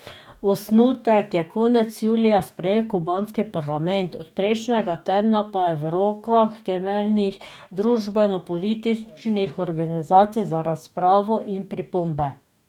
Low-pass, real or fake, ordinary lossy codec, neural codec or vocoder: 19.8 kHz; fake; none; codec, 44.1 kHz, 2.6 kbps, DAC